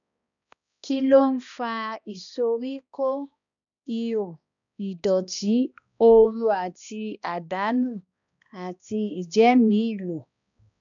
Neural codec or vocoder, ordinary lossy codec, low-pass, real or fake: codec, 16 kHz, 1 kbps, X-Codec, HuBERT features, trained on balanced general audio; none; 7.2 kHz; fake